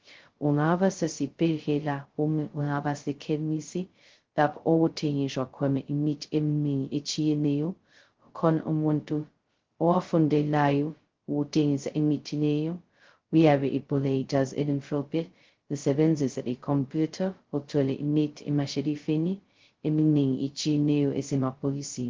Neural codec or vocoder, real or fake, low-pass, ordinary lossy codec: codec, 16 kHz, 0.2 kbps, FocalCodec; fake; 7.2 kHz; Opus, 16 kbps